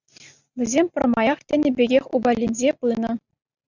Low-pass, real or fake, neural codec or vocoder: 7.2 kHz; real; none